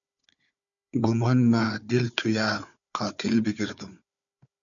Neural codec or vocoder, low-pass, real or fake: codec, 16 kHz, 4 kbps, FunCodec, trained on Chinese and English, 50 frames a second; 7.2 kHz; fake